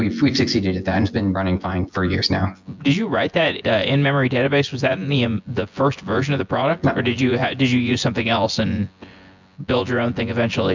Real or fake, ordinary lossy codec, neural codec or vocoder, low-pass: fake; MP3, 64 kbps; vocoder, 24 kHz, 100 mel bands, Vocos; 7.2 kHz